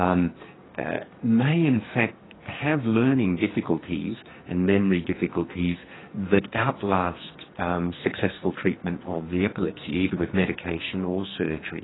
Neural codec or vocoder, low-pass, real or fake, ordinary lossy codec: codec, 44.1 kHz, 2.6 kbps, SNAC; 7.2 kHz; fake; AAC, 16 kbps